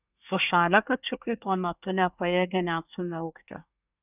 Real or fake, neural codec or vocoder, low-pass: fake; codec, 24 kHz, 1 kbps, SNAC; 3.6 kHz